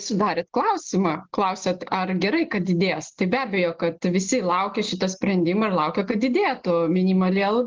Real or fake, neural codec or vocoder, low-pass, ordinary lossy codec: real; none; 7.2 kHz; Opus, 16 kbps